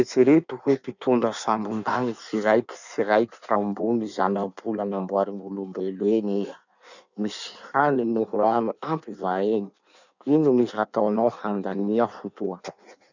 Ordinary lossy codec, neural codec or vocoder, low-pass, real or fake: none; codec, 16 kHz in and 24 kHz out, 1.1 kbps, FireRedTTS-2 codec; 7.2 kHz; fake